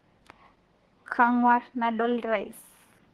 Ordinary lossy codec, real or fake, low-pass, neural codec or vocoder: Opus, 16 kbps; fake; 14.4 kHz; codec, 32 kHz, 1.9 kbps, SNAC